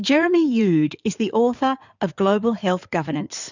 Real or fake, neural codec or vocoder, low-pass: fake; codec, 16 kHz in and 24 kHz out, 2.2 kbps, FireRedTTS-2 codec; 7.2 kHz